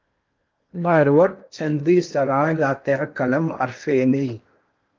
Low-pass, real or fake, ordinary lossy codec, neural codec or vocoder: 7.2 kHz; fake; Opus, 24 kbps; codec, 16 kHz in and 24 kHz out, 0.8 kbps, FocalCodec, streaming, 65536 codes